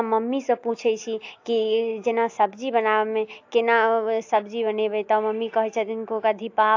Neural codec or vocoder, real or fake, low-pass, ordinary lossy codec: none; real; 7.2 kHz; AAC, 48 kbps